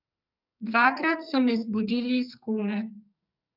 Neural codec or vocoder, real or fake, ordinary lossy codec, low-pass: codec, 44.1 kHz, 2.6 kbps, SNAC; fake; none; 5.4 kHz